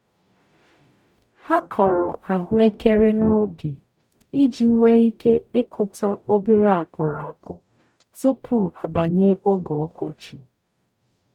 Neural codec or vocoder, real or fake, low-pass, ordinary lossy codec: codec, 44.1 kHz, 0.9 kbps, DAC; fake; 19.8 kHz; none